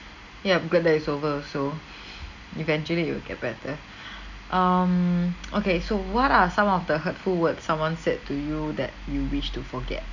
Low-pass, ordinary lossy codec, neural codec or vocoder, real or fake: 7.2 kHz; none; none; real